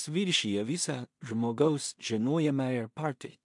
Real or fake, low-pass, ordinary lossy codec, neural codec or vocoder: fake; 10.8 kHz; MP3, 64 kbps; codec, 16 kHz in and 24 kHz out, 0.4 kbps, LongCat-Audio-Codec, two codebook decoder